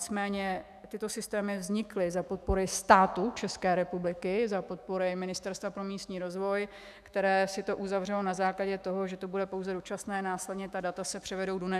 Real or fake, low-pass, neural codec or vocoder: fake; 14.4 kHz; autoencoder, 48 kHz, 128 numbers a frame, DAC-VAE, trained on Japanese speech